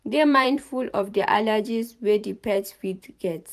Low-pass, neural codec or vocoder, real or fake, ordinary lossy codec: 14.4 kHz; vocoder, 44.1 kHz, 128 mel bands every 512 samples, BigVGAN v2; fake; none